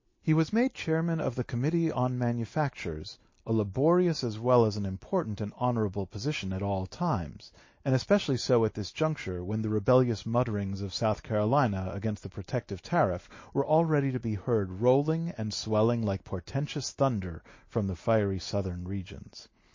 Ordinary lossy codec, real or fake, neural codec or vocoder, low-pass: MP3, 32 kbps; real; none; 7.2 kHz